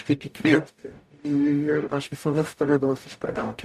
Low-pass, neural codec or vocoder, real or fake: 14.4 kHz; codec, 44.1 kHz, 0.9 kbps, DAC; fake